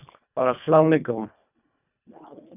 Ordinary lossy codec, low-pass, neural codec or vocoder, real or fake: none; 3.6 kHz; codec, 24 kHz, 1.5 kbps, HILCodec; fake